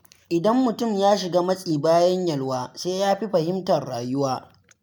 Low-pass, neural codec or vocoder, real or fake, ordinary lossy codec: none; none; real; none